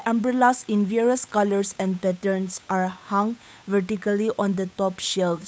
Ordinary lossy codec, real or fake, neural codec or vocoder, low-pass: none; fake; codec, 16 kHz, 8 kbps, FunCodec, trained on Chinese and English, 25 frames a second; none